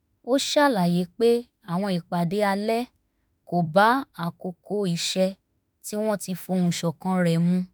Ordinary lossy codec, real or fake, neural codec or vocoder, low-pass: none; fake; autoencoder, 48 kHz, 32 numbers a frame, DAC-VAE, trained on Japanese speech; none